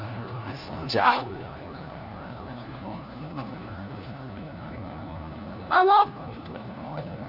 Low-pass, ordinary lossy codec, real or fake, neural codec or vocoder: 5.4 kHz; none; fake; codec, 16 kHz, 1 kbps, FunCodec, trained on LibriTTS, 50 frames a second